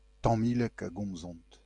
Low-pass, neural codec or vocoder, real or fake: 10.8 kHz; none; real